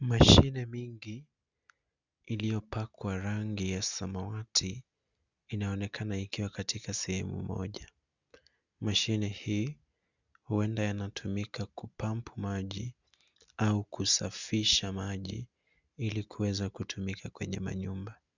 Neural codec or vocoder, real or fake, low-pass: none; real; 7.2 kHz